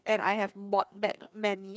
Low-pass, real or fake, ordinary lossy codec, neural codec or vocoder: none; fake; none; codec, 16 kHz, 2 kbps, FreqCodec, larger model